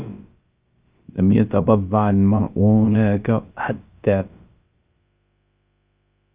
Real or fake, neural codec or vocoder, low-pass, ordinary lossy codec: fake; codec, 16 kHz, about 1 kbps, DyCAST, with the encoder's durations; 3.6 kHz; Opus, 24 kbps